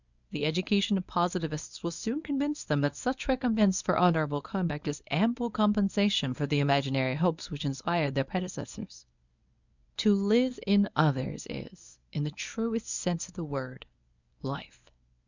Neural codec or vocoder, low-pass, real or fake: codec, 24 kHz, 0.9 kbps, WavTokenizer, medium speech release version 1; 7.2 kHz; fake